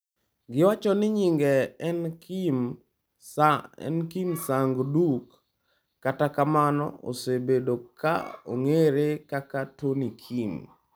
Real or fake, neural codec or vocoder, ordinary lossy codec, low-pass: real; none; none; none